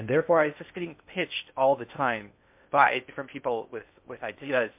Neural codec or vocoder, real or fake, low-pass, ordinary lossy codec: codec, 16 kHz in and 24 kHz out, 0.6 kbps, FocalCodec, streaming, 2048 codes; fake; 3.6 kHz; MP3, 32 kbps